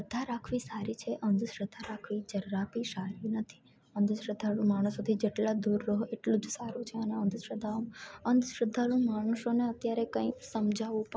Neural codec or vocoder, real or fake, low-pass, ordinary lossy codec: none; real; none; none